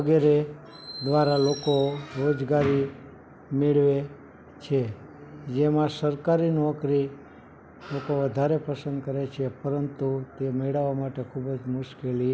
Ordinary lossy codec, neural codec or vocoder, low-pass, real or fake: none; none; none; real